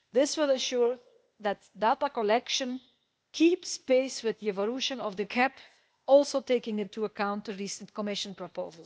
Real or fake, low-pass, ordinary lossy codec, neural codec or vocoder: fake; none; none; codec, 16 kHz, 0.8 kbps, ZipCodec